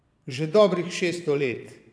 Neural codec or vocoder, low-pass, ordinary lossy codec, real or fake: vocoder, 22.05 kHz, 80 mel bands, Vocos; none; none; fake